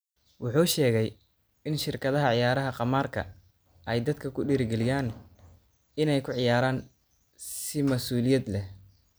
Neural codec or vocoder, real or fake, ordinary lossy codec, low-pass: none; real; none; none